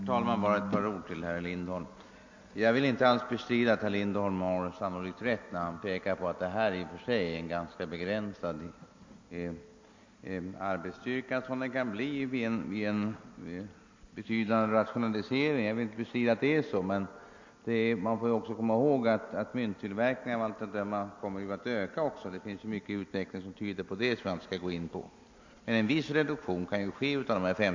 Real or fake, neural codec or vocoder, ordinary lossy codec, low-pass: real; none; MP3, 48 kbps; 7.2 kHz